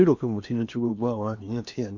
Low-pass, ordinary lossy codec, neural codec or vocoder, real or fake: 7.2 kHz; none; codec, 16 kHz in and 24 kHz out, 0.8 kbps, FocalCodec, streaming, 65536 codes; fake